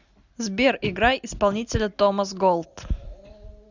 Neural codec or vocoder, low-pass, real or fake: none; 7.2 kHz; real